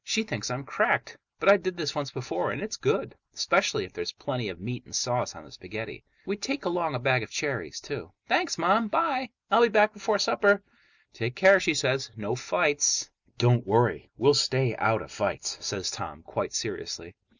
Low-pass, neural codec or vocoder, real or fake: 7.2 kHz; none; real